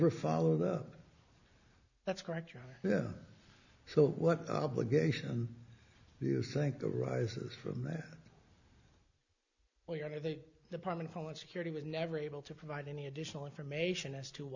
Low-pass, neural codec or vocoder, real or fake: 7.2 kHz; none; real